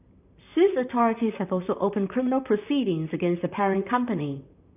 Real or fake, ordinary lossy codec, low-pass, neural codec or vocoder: fake; none; 3.6 kHz; vocoder, 44.1 kHz, 128 mel bands, Pupu-Vocoder